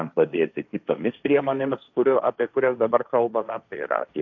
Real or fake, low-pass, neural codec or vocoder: fake; 7.2 kHz; codec, 16 kHz, 1.1 kbps, Voila-Tokenizer